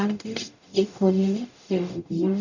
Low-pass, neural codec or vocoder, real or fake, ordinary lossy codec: 7.2 kHz; codec, 44.1 kHz, 0.9 kbps, DAC; fake; none